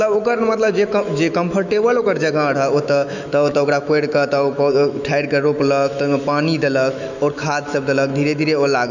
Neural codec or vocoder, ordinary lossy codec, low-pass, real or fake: autoencoder, 48 kHz, 128 numbers a frame, DAC-VAE, trained on Japanese speech; none; 7.2 kHz; fake